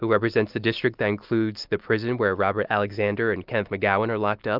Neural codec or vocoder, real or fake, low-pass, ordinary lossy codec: none; real; 5.4 kHz; Opus, 32 kbps